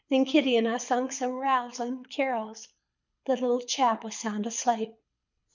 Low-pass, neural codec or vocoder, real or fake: 7.2 kHz; codec, 24 kHz, 6 kbps, HILCodec; fake